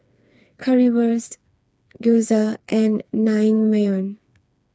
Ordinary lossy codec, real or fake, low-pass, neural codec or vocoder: none; fake; none; codec, 16 kHz, 4 kbps, FreqCodec, smaller model